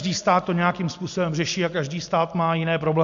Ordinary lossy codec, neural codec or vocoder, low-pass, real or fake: MP3, 64 kbps; none; 7.2 kHz; real